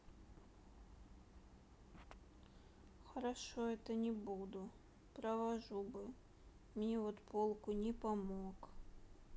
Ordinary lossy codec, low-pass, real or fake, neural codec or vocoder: none; none; real; none